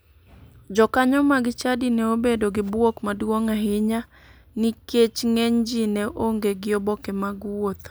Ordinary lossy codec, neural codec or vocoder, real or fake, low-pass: none; none; real; none